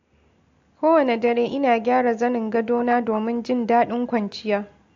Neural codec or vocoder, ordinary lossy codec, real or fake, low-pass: none; AAC, 48 kbps; real; 7.2 kHz